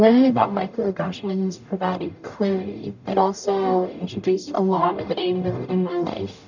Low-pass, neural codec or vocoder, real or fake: 7.2 kHz; codec, 44.1 kHz, 0.9 kbps, DAC; fake